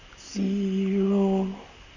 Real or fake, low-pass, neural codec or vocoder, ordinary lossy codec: fake; 7.2 kHz; vocoder, 44.1 kHz, 128 mel bands, Pupu-Vocoder; none